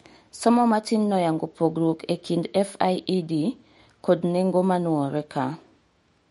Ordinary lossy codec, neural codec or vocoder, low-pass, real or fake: MP3, 48 kbps; autoencoder, 48 kHz, 128 numbers a frame, DAC-VAE, trained on Japanese speech; 19.8 kHz; fake